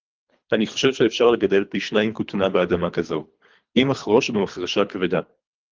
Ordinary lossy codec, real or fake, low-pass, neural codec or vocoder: Opus, 32 kbps; fake; 7.2 kHz; codec, 24 kHz, 3 kbps, HILCodec